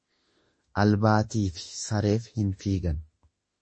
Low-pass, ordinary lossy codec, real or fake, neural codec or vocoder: 9.9 kHz; MP3, 32 kbps; fake; autoencoder, 48 kHz, 32 numbers a frame, DAC-VAE, trained on Japanese speech